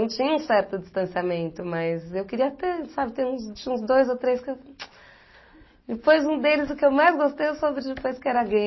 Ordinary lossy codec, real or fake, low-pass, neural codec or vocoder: MP3, 24 kbps; real; 7.2 kHz; none